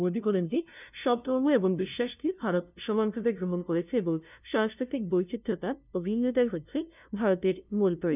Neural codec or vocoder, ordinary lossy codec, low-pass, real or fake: codec, 16 kHz, 0.5 kbps, FunCodec, trained on LibriTTS, 25 frames a second; none; 3.6 kHz; fake